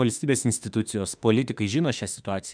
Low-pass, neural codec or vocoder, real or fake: 9.9 kHz; autoencoder, 48 kHz, 32 numbers a frame, DAC-VAE, trained on Japanese speech; fake